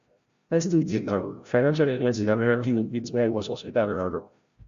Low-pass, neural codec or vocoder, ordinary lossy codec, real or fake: 7.2 kHz; codec, 16 kHz, 0.5 kbps, FreqCodec, larger model; Opus, 64 kbps; fake